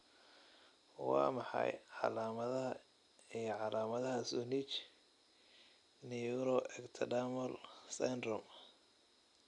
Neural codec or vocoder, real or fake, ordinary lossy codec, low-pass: none; real; none; 10.8 kHz